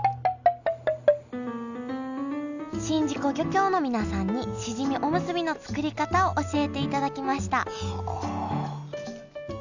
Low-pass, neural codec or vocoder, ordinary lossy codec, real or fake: 7.2 kHz; none; none; real